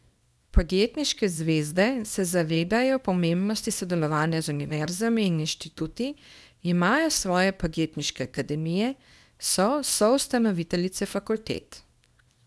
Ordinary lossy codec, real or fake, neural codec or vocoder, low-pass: none; fake; codec, 24 kHz, 0.9 kbps, WavTokenizer, small release; none